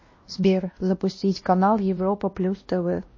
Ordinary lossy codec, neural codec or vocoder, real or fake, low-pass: MP3, 32 kbps; codec, 16 kHz, 1 kbps, X-Codec, WavLM features, trained on Multilingual LibriSpeech; fake; 7.2 kHz